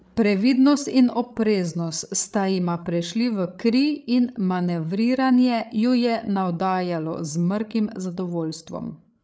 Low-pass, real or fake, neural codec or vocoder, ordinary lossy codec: none; fake; codec, 16 kHz, 8 kbps, FreqCodec, larger model; none